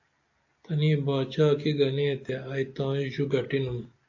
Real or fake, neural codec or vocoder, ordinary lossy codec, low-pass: real; none; AAC, 48 kbps; 7.2 kHz